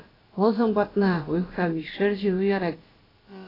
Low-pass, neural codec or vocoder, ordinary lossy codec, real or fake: 5.4 kHz; codec, 16 kHz, about 1 kbps, DyCAST, with the encoder's durations; AAC, 24 kbps; fake